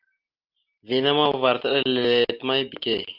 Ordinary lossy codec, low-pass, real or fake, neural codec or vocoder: Opus, 24 kbps; 5.4 kHz; real; none